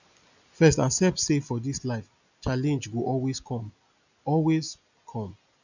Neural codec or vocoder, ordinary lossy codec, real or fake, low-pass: none; none; real; 7.2 kHz